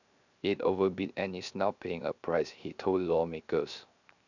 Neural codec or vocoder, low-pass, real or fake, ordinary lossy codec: codec, 16 kHz, 0.7 kbps, FocalCodec; 7.2 kHz; fake; none